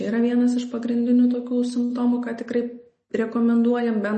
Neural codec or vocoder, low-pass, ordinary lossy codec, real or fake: none; 10.8 kHz; MP3, 32 kbps; real